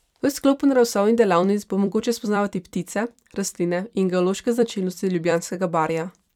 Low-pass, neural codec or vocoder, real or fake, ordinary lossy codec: 19.8 kHz; vocoder, 44.1 kHz, 128 mel bands every 256 samples, BigVGAN v2; fake; none